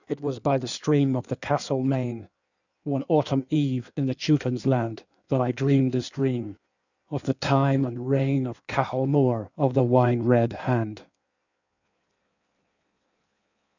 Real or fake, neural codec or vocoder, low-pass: fake; codec, 16 kHz in and 24 kHz out, 1.1 kbps, FireRedTTS-2 codec; 7.2 kHz